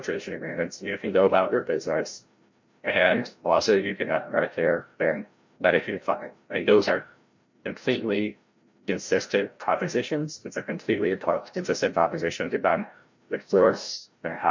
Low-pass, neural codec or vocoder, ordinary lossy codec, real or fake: 7.2 kHz; codec, 16 kHz, 0.5 kbps, FreqCodec, larger model; MP3, 48 kbps; fake